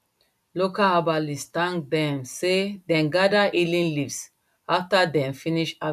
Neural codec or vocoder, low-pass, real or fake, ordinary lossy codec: none; 14.4 kHz; real; none